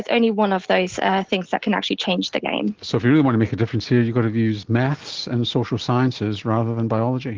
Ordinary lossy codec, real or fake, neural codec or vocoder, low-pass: Opus, 16 kbps; real; none; 7.2 kHz